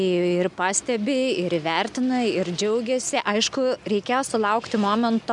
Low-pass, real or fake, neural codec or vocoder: 10.8 kHz; real; none